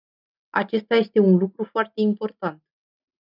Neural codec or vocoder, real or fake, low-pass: none; real; 5.4 kHz